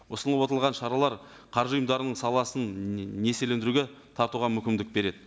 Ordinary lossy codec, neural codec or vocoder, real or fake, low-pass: none; none; real; none